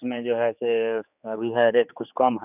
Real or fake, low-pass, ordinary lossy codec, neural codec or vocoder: fake; 3.6 kHz; none; codec, 16 kHz, 4 kbps, X-Codec, HuBERT features, trained on general audio